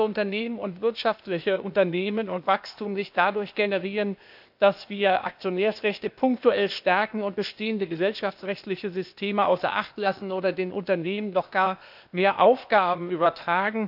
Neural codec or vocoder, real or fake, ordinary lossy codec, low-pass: codec, 16 kHz, 0.8 kbps, ZipCodec; fake; none; 5.4 kHz